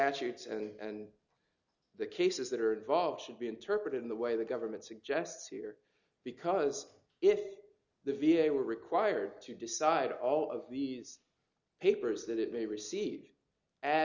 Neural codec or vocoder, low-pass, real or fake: none; 7.2 kHz; real